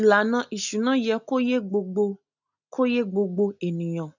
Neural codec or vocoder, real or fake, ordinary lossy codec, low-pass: none; real; none; 7.2 kHz